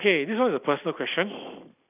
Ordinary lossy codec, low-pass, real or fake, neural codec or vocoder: none; 3.6 kHz; real; none